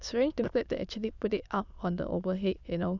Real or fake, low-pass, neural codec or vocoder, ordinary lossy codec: fake; 7.2 kHz; autoencoder, 22.05 kHz, a latent of 192 numbers a frame, VITS, trained on many speakers; none